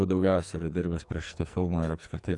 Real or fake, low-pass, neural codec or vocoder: fake; 10.8 kHz; codec, 44.1 kHz, 2.6 kbps, SNAC